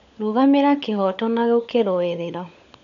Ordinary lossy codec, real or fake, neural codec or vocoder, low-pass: MP3, 96 kbps; fake; codec, 16 kHz, 16 kbps, FunCodec, trained on LibriTTS, 50 frames a second; 7.2 kHz